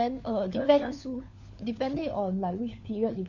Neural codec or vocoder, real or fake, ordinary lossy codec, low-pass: codec, 16 kHz, 4 kbps, FunCodec, trained on LibriTTS, 50 frames a second; fake; none; 7.2 kHz